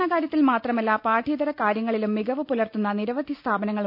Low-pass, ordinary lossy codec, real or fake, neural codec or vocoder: 5.4 kHz; none; real; none